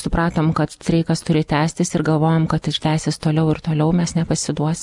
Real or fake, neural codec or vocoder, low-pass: real; none; 10.8 kHz